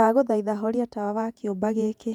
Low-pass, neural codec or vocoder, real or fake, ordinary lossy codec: 19.8 kHz; vocoder, 48 kHz, 128 mel bands, Vocos; fake; none